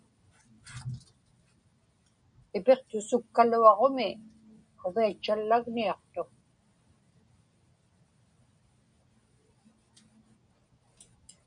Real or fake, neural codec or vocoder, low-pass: real; none; 9.9 kHz